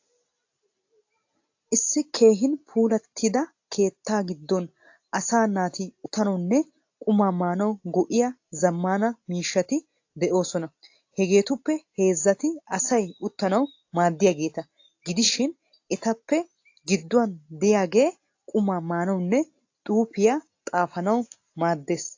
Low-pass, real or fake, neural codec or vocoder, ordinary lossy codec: 7.2 kHz; real; none; AAC, 48 kbps